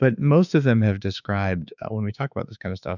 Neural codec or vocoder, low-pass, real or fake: autoencoder, 48 kHz, 32 numbers a frame, DAC-VAE, trained on Japanese speech; 7.2 kHz; fake